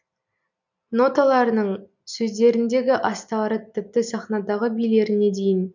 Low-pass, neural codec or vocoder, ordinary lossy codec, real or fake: 7.2 kHz; none; none; real